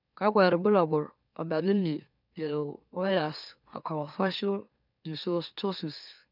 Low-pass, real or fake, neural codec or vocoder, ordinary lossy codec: 5.4 kHz; fake; autoencoder, 44.1 kHz, a latent of 192 numbers a frame, MeloTTS; none